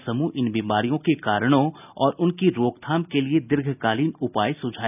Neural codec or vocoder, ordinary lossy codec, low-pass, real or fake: none; none; 3.6 kHz; real